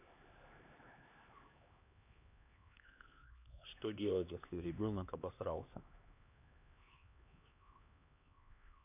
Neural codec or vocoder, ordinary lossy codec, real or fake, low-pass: codec, 16 kHz, 2 kbps, X-Codec, HuBERT features, trained on LibriSpeech; none; fake; 3.6 kHz